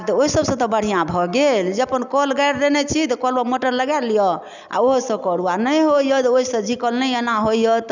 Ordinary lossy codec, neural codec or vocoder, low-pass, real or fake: none; none; 7.2 kHz; real